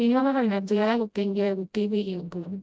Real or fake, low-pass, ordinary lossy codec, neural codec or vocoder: fake; none; none; codec, 16 kHz, 0.5 kbps, FreqCodec, smaller model